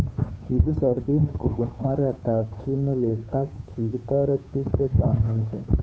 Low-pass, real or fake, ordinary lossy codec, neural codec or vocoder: none; fake; none; codec, 16 kHz, 2 kbps, FunCodec, trained on Chinese and English, 25 frames a second